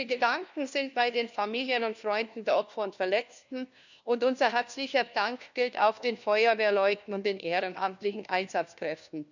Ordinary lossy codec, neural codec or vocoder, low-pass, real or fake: none; codec, 16 kHz, 1 kbps, FunCodec, trained on LibriTTS, 50 frames a second; 7.2 kHz; fake